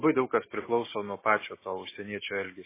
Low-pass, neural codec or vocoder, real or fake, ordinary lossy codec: 3.6 kHz; none; real; MP3, 16 kbps